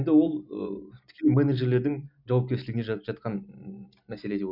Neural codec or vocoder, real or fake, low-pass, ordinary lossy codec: none; real; 5.4 kHz; none